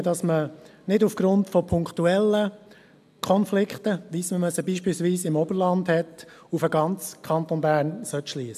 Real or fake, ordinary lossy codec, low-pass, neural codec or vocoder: real; AAC, 96 kbps; 14.4 kHz; none